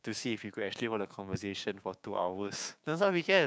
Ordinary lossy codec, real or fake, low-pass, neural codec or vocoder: none; fake; none; codec, 16 kHz, 6 kbps, DAC